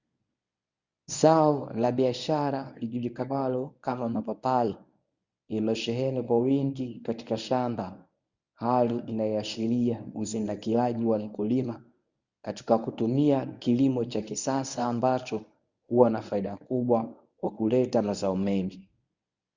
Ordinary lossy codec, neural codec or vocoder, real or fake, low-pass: Opus, 64 kbps; codec, 24 kHz, 0.9 kbps, WavTokenizer, medium speech release version 1; fake; 7.2 kHz